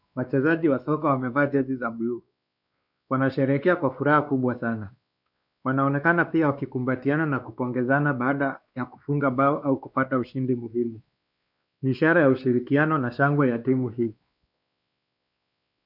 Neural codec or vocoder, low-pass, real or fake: codec, 16 kHz, 2 kbps, X-Codec, WavLM features, trained on Multilingual LibriSpeech; 5.4 kHz; fake